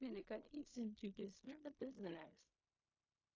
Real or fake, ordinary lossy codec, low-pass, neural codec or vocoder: fake; AAC, 32 kbps; 7.2 kHz; codec, 16 kHz in and 24 kHz out, 0.4 kbps, LongCat-Audio-Codec, fine tuned four codebook decoder